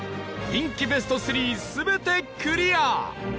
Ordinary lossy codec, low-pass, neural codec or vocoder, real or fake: none; none; none; real